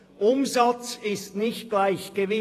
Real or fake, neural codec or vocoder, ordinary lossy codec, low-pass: fake; vocoder, 48 kHz, 128 mel bands, Vocos; AAC, 48 kbps; 14.4 kHz